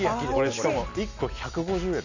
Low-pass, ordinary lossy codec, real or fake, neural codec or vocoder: 7.2 kHz; none; real; none